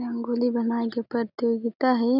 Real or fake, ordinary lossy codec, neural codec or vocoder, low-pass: real; none; none; 5.4 kHz